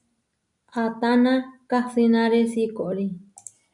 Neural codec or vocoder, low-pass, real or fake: none; 10.8 kHz; real